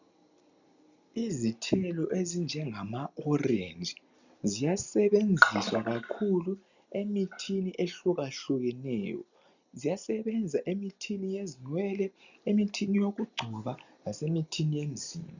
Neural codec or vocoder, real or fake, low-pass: none; real; 7.2 kHz